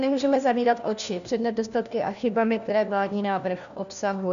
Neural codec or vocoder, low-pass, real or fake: codec, 16 kHz, 1 kbps, FunCodec, trained on LibriTTS, 50 frames a second; 7.2 kHz; fake